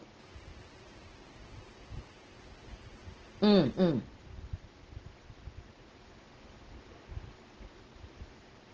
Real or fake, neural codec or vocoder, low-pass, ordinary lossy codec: real; none; 7.2 kHz; Opus, 16 kbps